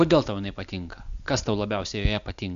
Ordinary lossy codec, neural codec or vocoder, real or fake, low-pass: AAC, 96 kbps; none; real; 7.2 kHz